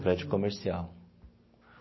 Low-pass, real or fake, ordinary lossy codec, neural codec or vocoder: 7.2 kHz; real; MP3, 24 kbps; none